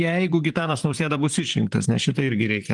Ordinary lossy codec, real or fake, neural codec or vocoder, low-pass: Opus, 24 kbps; fake; vocoder, 44.1 kHz, 128 mel bands, Pupu-Vocoder; 10.8 kHz